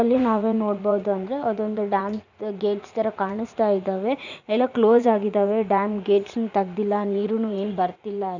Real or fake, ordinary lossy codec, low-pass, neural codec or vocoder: fake; none; 7.2 kHz; vocoder, 44.1 kHz, 80 mel bands, Vocos